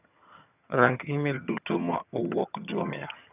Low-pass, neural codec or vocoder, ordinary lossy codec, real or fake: 3.6 kHz; vocoder, 22.05 kHz, 80 mel bands, HiFi-GAN; none; fake